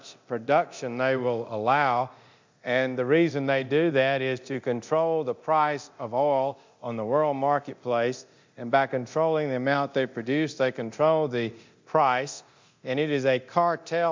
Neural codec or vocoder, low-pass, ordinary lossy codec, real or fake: codec, 24 kHz, 0.9 kbps, DualCodec; 7.2 kHz; MP3, 64 kbps; fake